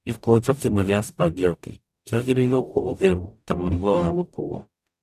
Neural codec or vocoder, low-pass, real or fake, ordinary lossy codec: codec, 44.1 kHz, 0.9 kbps, DAC; 14.4 kHz; fake; none